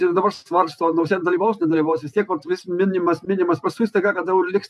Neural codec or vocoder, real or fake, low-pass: vocoder, 48 kHz, 128 mel bands, Vocos; fake; 14.4 kHz